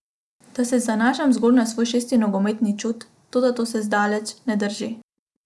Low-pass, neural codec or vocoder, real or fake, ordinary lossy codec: none; none; real; none